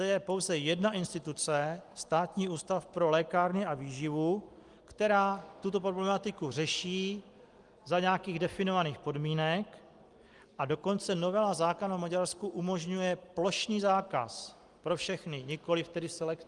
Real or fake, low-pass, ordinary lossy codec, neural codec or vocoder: real; 10.8 kHz; Opus, 24 kbps; none